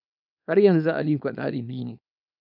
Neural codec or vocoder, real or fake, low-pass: codec, 24 kHz, 0.9 kbps, WavTokenizer, small release; fake; 5.4 kHz